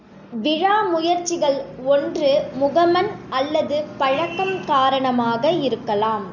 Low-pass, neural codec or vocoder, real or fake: 7.2 kHz; none; real